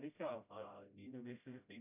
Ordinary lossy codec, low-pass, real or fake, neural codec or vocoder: none; 3.6 kHz; fake; codec, 16 kHz, 0.5 kbps, FreqCodec, smaller model